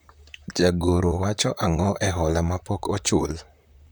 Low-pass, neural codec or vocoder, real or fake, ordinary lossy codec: none; vocoder, 44.1 kHz, 128 mel bands, Pupu-Vocoder; fake; none